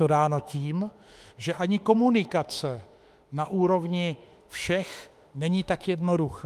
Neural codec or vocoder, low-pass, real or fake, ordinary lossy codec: autoencoder, 48 kHz, 32 numbers a frame, DAC-VAE, trained on Japanese speech; 14.4 kHz; fake; Opus, 32 kbps